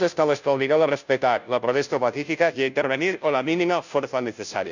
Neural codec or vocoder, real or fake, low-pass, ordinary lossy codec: codec, 16 kHz, 0.5 kbps, FunCodec, trained on Chinese and English, 25 frames a second; fake; 7.2 kHz; none